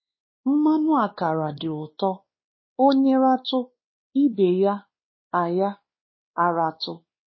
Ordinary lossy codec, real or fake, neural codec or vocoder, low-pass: MP3, 24 kbps; fake; codec, 16 kHz, 2 kbps, X-Codec, WavLM features, trained on Multilingual LibriSpeech; 7.2 kHz